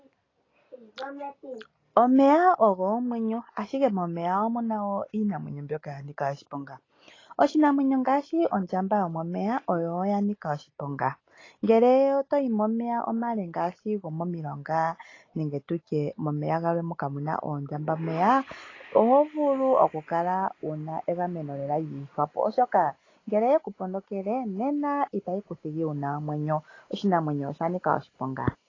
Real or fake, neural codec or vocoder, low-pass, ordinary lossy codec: real; none; 7.2 kHz; AAC, 32 kbps